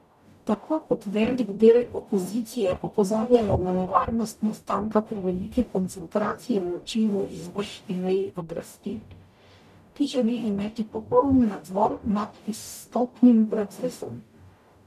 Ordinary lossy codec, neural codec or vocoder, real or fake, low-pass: none; codec, 44.1 kHz, 0.9 kbps, DAC; fake; 14.4 kHz